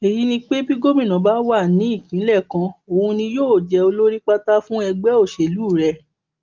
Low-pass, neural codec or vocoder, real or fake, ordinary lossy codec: 7.2 kHz; none; real; Opus, 32 kbps